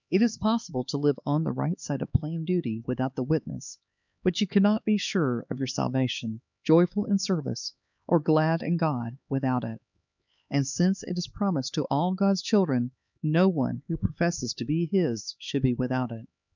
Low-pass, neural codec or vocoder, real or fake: 7.2 kHz; codec, 16 kHz, 4 kbps, X-Codec, HuBERT features, trained on LibriSpeech; fake